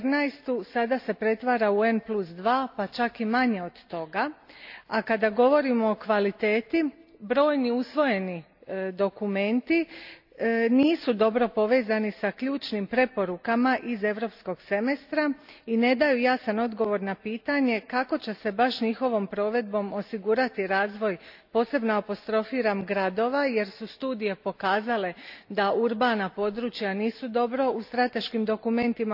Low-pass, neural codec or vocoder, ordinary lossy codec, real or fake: 5.4 kHz; none; AAC, 48 kbps; real